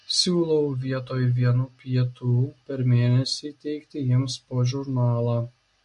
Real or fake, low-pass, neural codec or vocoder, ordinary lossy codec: real; 14.4 kHz; none; MP3, 48 kbps